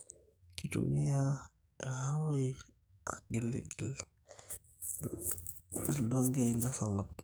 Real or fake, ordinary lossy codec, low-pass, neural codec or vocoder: fake; none; none; codec, 44.1 kHz, 2.6 kbps, SNAC